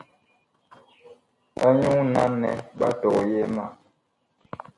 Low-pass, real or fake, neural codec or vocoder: 10.8 kHz; real; none